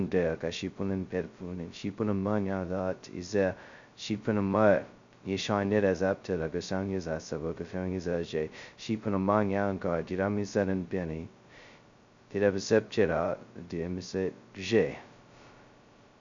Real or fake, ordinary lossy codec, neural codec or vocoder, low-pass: fake; MP3, 64 kbps; codec, 16 kHz, 0.2 kbps, FocalCodec; 7.2 kHz